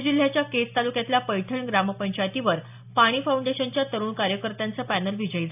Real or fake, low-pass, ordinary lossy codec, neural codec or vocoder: real; 3.6 kHz; AAC, 32 kbps; none